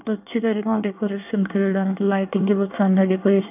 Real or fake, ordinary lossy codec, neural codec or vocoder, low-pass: fake; none; codec, 24 kHz, 1 kbps, SNAC; 3.6 kHz